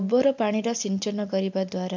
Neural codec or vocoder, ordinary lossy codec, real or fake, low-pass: none; MP3, 64 kbps; real; 7.2 kHz